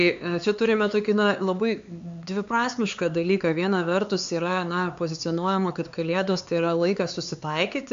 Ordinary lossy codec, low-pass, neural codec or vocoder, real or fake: AAC, 64 kbps; 7.2 kHz; codec, 16 kHz, 4 kbps, X-Codec, HuBERT features, trained on LibriSpeech; fake